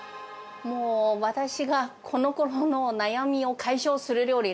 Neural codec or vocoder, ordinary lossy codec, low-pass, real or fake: none; none; none; real